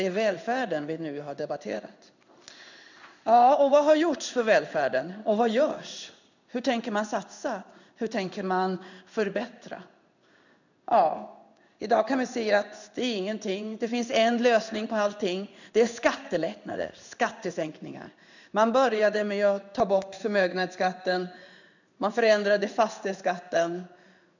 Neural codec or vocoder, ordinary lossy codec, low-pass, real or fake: codec, 16 kHz in and 24 kHz out, 1 kbps, XY-Tokenizer; none; 7.2 kHz; fake